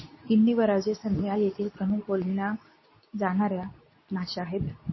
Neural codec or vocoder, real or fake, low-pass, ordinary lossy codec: codec, 24 kHz, 0.9 kbps, WavTokenizer, medium speech release version 2; fake; 7.2 kHz; MP3, 24 kbps